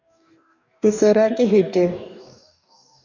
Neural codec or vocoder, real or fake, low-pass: codec, 44.1 kHz, 2.6 kbps, DAC; fake; 7.2 kHz